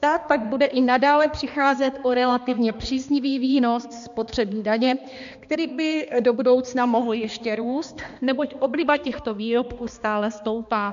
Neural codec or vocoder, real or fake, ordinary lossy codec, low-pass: codec, 16 kHz, 2 kbps, X-Codec, HuBERT features, trained on balanced general audio; fake; MP3, 64 kbps; 7.2 kHz